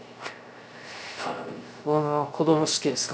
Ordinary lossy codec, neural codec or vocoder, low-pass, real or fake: none; codec, 16 kHz, 0.3 kbps, FocalCodec; none; fake